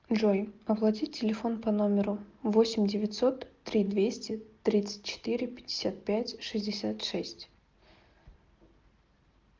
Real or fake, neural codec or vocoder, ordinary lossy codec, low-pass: real; none; Opus, 32 kbps; 7.2 kHz